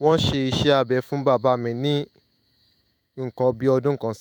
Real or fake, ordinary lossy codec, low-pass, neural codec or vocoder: fake; none; none; autoencoder, 48 kHz, 128 numbers a frame, DAC-VAE, trained on Japanese speech